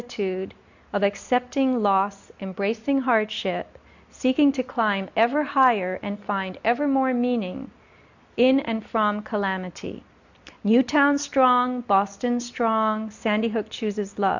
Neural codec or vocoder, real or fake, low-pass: none; real; 7.2 kHz